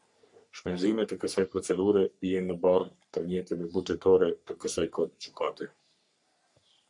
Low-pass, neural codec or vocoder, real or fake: 10.8 kHz; codec, 44.1 kHz, 3.4 kbps, Pupu-Codec; fake